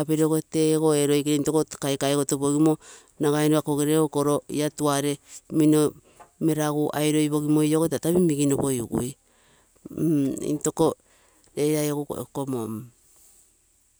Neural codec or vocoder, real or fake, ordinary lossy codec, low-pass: none; real; none; none